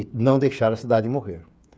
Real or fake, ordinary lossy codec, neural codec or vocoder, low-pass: fake; none; codec, 16 kHz, 8 kbps, FreqCodec, smaller model; none